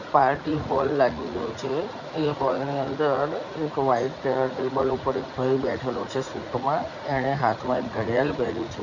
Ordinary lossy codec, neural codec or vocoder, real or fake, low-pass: none; vocoder, 44.1 kHz, 80 mel bands, Vocos; fake; 7.2 kHz